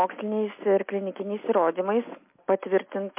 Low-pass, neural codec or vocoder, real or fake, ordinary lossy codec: 3.6 kHz; none; real; MP3, 24 kbps